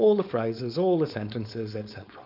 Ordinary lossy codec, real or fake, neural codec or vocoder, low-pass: AAC, 48 kbps; fake; codec, 16 kHz, 4.8 kbps, FACodec; 5.4 kHz